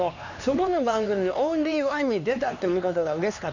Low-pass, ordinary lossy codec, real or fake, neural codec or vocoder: 7.2 kHz; none; fake; codec, 16 kHz, 2 kbps, X-Codec, HuBERT features, trained on LibriSpeech